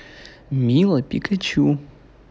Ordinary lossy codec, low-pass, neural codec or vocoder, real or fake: none; none; none; real